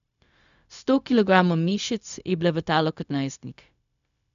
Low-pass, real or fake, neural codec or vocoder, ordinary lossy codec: 7.2 kHz; fake; codec, 16 kHz, 0.4 kbps, LongCat-Audio-Codec; none